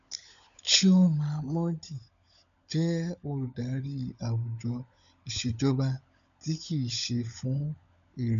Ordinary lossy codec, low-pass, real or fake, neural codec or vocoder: none; 7.2 kHz; fake; codec, 16 kHz, 16 kbps, FunCodec, trained on LibriTTS, 50 frames a second